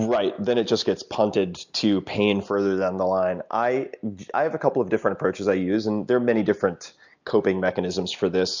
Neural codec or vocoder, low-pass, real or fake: none; 7.2 kHz; real